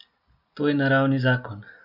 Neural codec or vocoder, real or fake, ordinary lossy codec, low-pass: none; real; none; 5.4 kHz